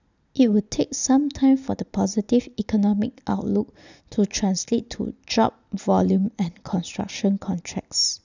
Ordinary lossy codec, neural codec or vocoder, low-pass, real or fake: none; none; 7.2 kHz; real